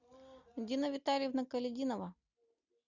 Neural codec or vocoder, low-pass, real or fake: none; 7.2 kHz; real